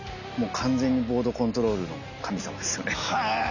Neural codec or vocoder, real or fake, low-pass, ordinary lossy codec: none; real; 7.2 kHz; none